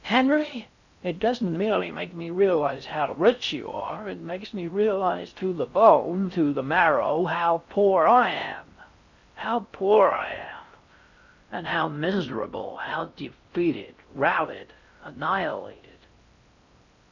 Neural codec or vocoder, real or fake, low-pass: codec, 16 kHz in and 24 kHz out, 0.6 kbps, FocalCodec, streaming, 4096 codes; fake; 7.2 kHz